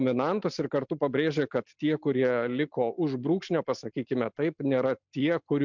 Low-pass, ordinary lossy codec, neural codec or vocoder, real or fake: 7.2 kHz; MP3, 64 kbps; none; real